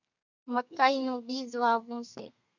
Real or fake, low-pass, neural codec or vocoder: fake; 7.2 kHz; codec, 32 kHz, 1.9 kbps, SNAC